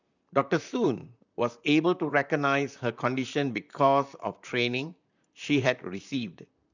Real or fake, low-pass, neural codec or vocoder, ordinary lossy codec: fake; 7.2 kHz; vocoder, 44.1 kHz, 128 mel bands, Pupu-Vocoder; none